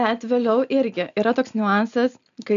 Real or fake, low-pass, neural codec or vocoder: real; 7.2 kHz; none